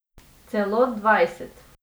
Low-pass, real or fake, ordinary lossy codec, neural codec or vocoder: none; real; none; none